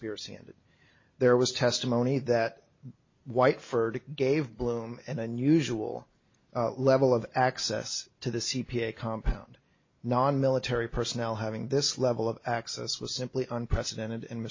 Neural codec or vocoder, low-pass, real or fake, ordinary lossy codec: none; 7.2 kHz; real; MP3, 32 kbps